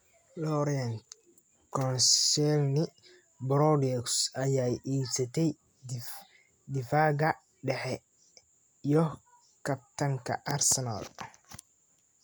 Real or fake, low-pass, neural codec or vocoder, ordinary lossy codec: real; none; none; none